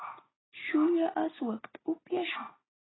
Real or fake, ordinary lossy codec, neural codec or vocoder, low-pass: real; AAC, 16 kbps; none; 7.2 kHz